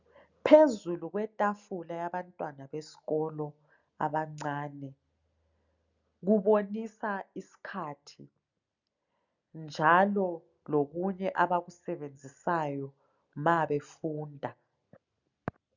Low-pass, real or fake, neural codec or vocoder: 7.2 kHz; real; none